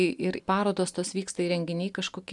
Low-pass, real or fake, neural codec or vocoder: 10.8 kHz; real; none